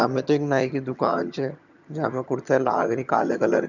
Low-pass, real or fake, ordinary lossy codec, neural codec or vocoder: 7.2 kHz; fake; none; vocoder, 22.05 kHz, 80 mel bands, HiFi-GAN